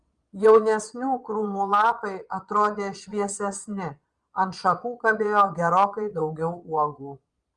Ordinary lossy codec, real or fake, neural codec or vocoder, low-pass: Opus, 32 kbps; fake; vocoder, 22.05 kHz, 80 mel bands, Vocos; 9.9 kHz